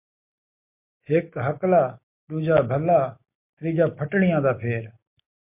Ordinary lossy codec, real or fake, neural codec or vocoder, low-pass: MP3, 32 kbps; real; none; 3.6 kHz